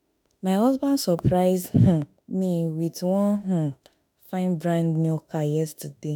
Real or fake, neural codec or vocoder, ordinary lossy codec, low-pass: fake; autoencoder, 48 kHz, 32 numbers a frame, DAC-VAE, trained on Japanese speech; none; none